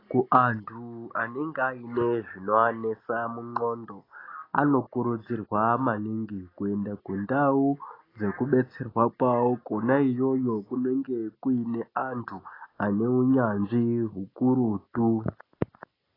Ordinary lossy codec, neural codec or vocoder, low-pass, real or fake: AAC, 24 kbps; none; 5.4 kHz; real